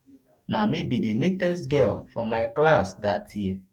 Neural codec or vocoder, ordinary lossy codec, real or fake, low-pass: codec, 44.1 kHz, 2.6 kbps, DAC; none; fake; 19.8 kHz